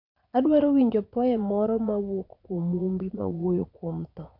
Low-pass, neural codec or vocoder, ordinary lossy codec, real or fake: 5.4 kHz; vocoder, 22.05 kHz, 80 mel bands, Vocos; none; fake